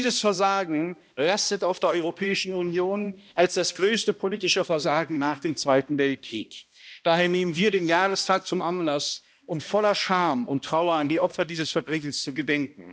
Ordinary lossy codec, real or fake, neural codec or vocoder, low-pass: none; fake; codec, 16 kHz, 1 kbps, X-Codec, HuBERT features, trained on balanced general audio; none